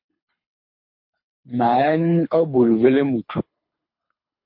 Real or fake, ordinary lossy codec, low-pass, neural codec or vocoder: fake; MP3, 32 kbps; 5.4 kHz; codec, 24 kHz, 3 kbps, HILCodec